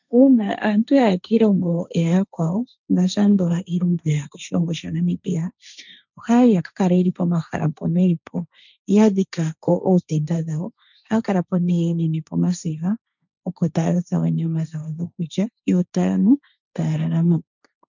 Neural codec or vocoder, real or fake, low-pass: codec, 16 kHz, 1.1 kbps, Voila-Tokenizer; fake; 7.2 kHz